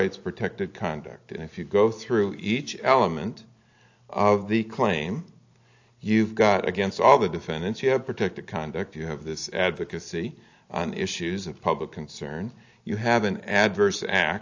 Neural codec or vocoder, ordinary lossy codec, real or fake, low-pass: none; AAC, 48 kbps; real; 7.2 kHz